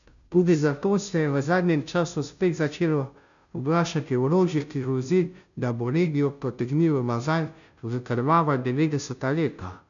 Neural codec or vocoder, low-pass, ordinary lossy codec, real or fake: codec, 16 kHz, 0.5 kbps, FunCodec, trained on Chinese and English, 25 frames a second; 7.2 kHz; none; fake